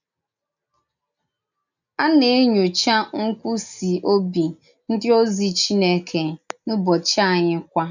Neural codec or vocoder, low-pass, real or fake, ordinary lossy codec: none; 7.2 kHz; real; none